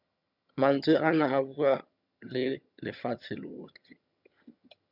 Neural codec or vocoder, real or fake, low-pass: vocoder, 22.05 kHz, 80 mel bands, HiFi-GAN; fake; 5.4 kHz